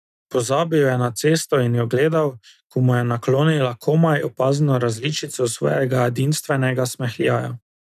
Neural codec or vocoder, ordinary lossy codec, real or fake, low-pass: none; none; real; 14.4 kHz